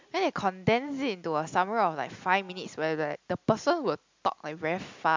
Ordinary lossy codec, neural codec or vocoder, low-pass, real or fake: MP3, 64 kbps; none; 7.2 kHz; real